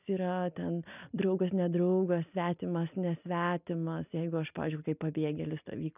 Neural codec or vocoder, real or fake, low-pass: none; real; 3.6 kHz